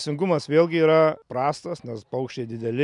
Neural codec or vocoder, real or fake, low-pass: none; real; 10.8 kHz